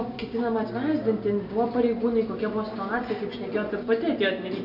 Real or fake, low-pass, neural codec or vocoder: real; 5.4 kHz; none